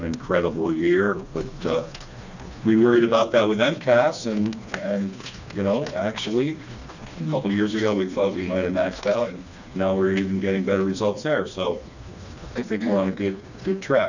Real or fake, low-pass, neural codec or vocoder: fake; 7.2 kHz; codec, 16 kHz, 2 kbps, FreqCodec, smaller model